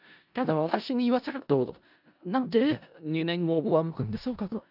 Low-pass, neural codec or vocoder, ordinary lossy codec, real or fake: 5.4 kHz; codec, 16 kHz in and 24 kHz out, 0.4 kbps, LongCat-Audio-Codec, four codebook decoder; none; fake